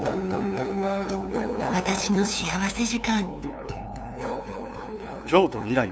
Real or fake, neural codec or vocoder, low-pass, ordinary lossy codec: fake; codec, 16 kHz, 2 kbps, FunCodec, trained on LibriTTS, 25 frames a second; none; none